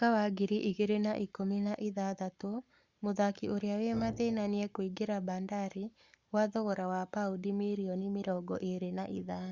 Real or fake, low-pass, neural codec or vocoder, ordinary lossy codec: fake; 7.2 kHz; codec, 44.1 kHz, 7.8 kbps, Pupu-Codec; Opus, 64 kbps